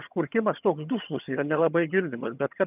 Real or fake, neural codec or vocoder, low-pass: fake; vocoder, 22.05 kHz, 80 mel bands, HiFi-GAN; 3.6 kHz